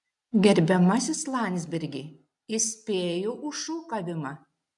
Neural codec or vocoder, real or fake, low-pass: none; real; 10.8 kHz